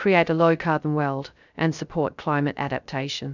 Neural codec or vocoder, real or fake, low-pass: codec, 16 kHz, 0.3 kbps, FocalCodec; fake; 7.2 kHz